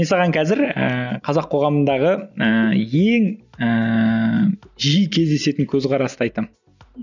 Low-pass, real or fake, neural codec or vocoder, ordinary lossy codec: 7.2 kHz; real; none; none